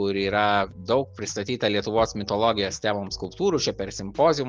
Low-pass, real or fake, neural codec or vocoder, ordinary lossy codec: 7.2 kHz; real; none; Opus, 24 kbps